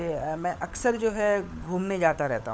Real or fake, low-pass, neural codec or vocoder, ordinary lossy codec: fake; none; codec, 16 kHz, 16 kbps, FunCodec, trained on LibriTTS, 50 frames a second; none